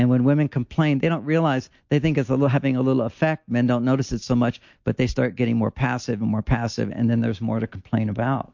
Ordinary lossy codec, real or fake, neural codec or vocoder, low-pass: MP3, 48 kbps; real; none; 7.2 kHz